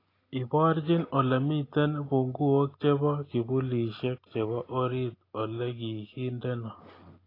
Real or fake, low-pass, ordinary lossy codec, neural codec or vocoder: real; 5.4 kHz; AAC, 24 kbps; none